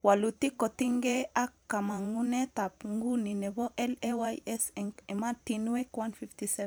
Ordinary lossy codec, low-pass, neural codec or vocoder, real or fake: none; none; vocoder, 44.1 kHz, 128 mel bands every 512 samples, BigVGAN v2; fake